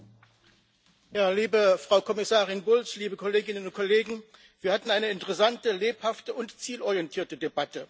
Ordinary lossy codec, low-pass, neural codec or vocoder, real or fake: none; none; none; real